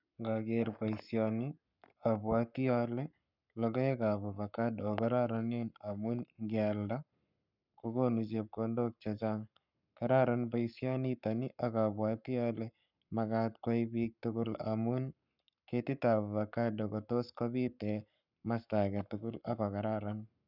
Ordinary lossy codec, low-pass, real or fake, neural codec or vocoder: none; 5.4 kHz; fake; codec, 44.1 kHz, 7.8 kbps, Pupu-Codec